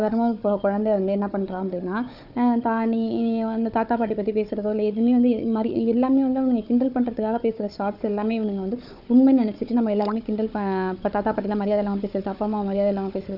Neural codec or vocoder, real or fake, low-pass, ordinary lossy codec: codec, 16 kHz, 4 kbps, FunCodec, trained on Chinese and English, 50 frames a second; fake; 5.4 kHz; none